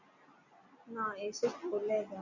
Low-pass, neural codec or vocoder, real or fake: 7.2 kHz; none; real